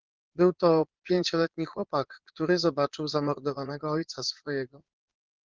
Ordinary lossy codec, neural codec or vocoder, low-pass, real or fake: Opus, 16 kbps; vocoder, 22.05 kHz, 80 mel bands, Vocos; 7.2 kHz; fake